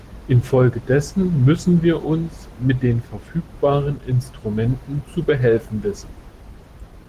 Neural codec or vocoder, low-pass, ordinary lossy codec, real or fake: none; 14.4 kHz; Opus, 16 kbps; real